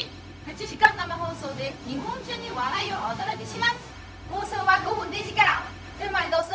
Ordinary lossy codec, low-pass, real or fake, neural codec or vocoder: none; none; fake; codec, 16 kHz, 0.4 kbps, LongCat-Audio-Codec